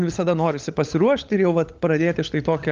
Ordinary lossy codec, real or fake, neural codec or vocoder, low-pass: Opus, 32 kbps; fake; codec, 16 kHz, 16 kbps, FunCodec, trained on LibriTTS, 50 frames a second; 7.2 kHz